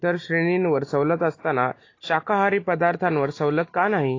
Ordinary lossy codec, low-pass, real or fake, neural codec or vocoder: AAC, 32 kbps; 7.2 kHz; real; none